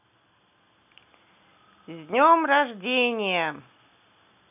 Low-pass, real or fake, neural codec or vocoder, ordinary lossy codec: 3.6 kHz; real; none; none